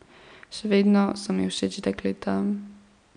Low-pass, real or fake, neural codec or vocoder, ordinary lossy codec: 9.9 kHz; real; none; none